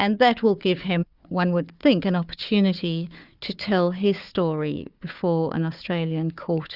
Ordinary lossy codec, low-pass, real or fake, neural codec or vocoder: Opus, 64 kbps; 5.4 kHz; fake; codec, 16 kHz, 4 kbps, FunCodec, trained on Chinese and English, 50 frames a second